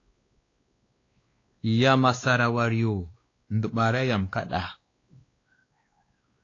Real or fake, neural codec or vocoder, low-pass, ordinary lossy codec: fake; codec, 16 kHz, 2 kbps, X-Codec, WavLM features, trained on Multilingual LibriSpeech; 7.2 kHz; AAC, 32 kbps